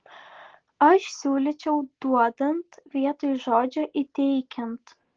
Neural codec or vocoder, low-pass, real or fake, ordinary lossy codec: none; 7.2 kHz; real; Opus, 16 kbps